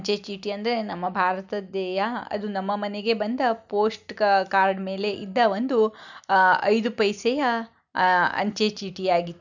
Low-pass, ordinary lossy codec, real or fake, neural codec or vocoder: 7.2 kHz; none; real; none